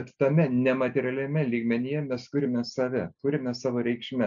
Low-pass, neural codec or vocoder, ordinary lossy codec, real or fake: 7.2 kHz; none; MP3, 96 kbps; real